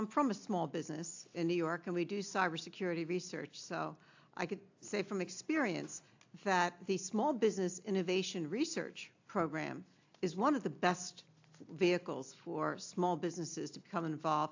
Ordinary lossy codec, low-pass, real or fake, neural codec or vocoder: AAC, 48 kbps; 7.2 kHz; real; none